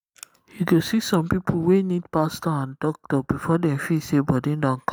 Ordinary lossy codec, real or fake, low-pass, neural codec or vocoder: none; real; none; none